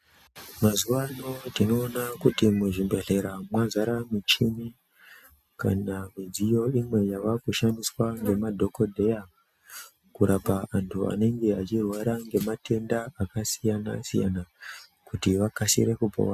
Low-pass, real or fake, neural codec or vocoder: 14.4 kHz; real; none